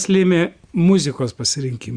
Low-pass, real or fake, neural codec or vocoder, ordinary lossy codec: 9.9 kHz; real; none; Opus, 64 kbps